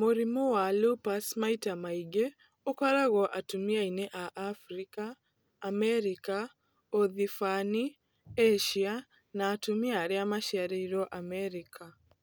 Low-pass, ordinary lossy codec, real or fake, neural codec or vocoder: none; none; real; none